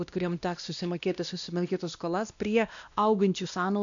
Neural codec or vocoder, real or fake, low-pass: codec, 16 kHz, 1 kbps, X-Codec, WavLM features, trained on Multilingual LibriSpeech; fake; 7.2 kHz